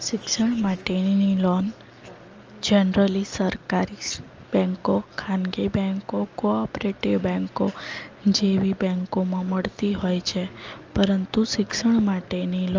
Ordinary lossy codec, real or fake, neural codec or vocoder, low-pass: Opus, 24 kbps; real; none; 7.2 kHz